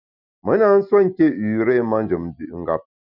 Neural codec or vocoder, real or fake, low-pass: none; real; 5.4 kHz